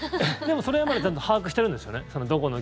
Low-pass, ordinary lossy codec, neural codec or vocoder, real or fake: none; none; none; real